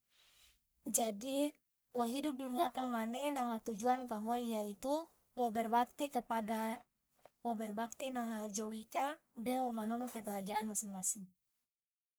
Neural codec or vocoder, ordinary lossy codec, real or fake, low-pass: codec, 44.1 kHz, 1.7 kbps, Pupu-Codec; none; fake; none